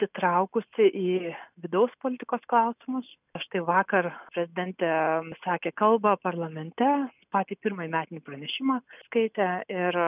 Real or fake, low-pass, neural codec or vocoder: real; 3.6 kHz; none